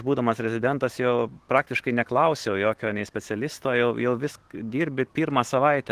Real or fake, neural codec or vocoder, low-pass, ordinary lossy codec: fake; autoencoder, 48 kHz, 128 numbers a frame, DAC-VAE, trained on Japanese speech; 14.4 kHz; Opus, 16 kbps